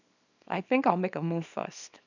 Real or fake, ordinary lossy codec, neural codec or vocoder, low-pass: fake; none; codec, 24 kHz, 0.9 kbps, WavTokenizer, small release; 7.2 kHz